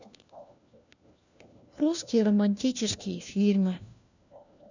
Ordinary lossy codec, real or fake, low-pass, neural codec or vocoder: none; fake; 7.2 kHz; codec, 16 kHz, 1 kbps, FunCodec, trained on LibriTTS, 50 frames a second